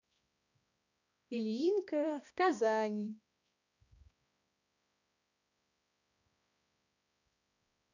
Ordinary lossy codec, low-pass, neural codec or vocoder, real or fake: none; 7.2 kHz; codec, 16 kHz, 1 kbps, X-Codec, HuBERT features, trained on balanced general audio; fake